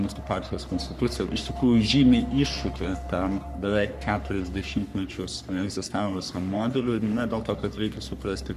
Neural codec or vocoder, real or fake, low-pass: codec, 44.1 kHz, 3.4 kbps, Pupu-Codec; fake; 14.4 kHz